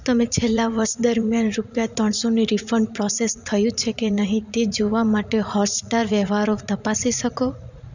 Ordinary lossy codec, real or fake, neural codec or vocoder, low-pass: none; fake; vocoder, 44.1 kHz, 80 mel bands, Vocos; 7.2 kHz